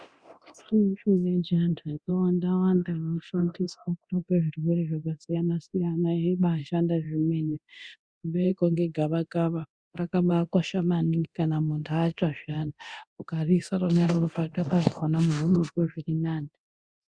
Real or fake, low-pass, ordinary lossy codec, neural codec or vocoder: fake; 9.9 kHz; Opus, 64 kbps; codec, 24 kHz, 0.9 kbps, DualCodec